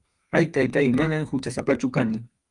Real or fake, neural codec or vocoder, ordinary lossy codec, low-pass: fake; codec, 32 kHz, 1.9 kbps, SNAC; Opus, 32 kbps; 10.8 kHz